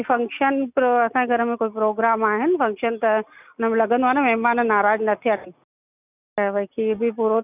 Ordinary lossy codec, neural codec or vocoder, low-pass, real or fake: none; none; 3.6 kHz; real